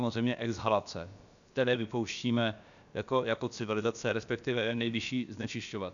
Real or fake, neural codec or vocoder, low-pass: fake; codec, 16 kHz, about 1 kbps, DyCAST, with the encoder's durations; 7.2 kHz